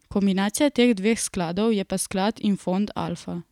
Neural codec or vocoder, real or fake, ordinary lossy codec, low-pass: none; real; none; 19.8 kHz